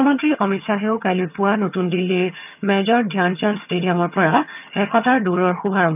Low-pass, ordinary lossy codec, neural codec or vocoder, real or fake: 3.6 kHz; none; vocoder, 22.05 kHz, 80 mel bands, HiFi-GAN; fake